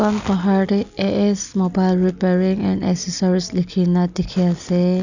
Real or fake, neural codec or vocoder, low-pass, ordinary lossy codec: real; none; 7.2 kHz; none